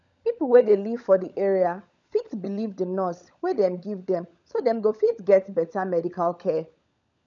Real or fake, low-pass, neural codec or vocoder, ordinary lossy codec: fake; 7.2 kHz; codec, 16 kHz, 16 kbps, FunCodec, trained on LibriTTS, 50 frames a second; none